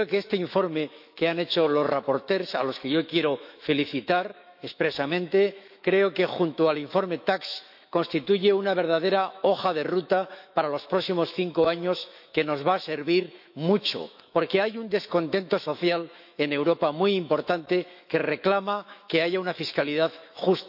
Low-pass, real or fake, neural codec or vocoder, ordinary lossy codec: 5.4 kHz; fake; autoencoder, 48 kHz, 128 numbers a frame, DAC-VAE, trained on Japanese speech; none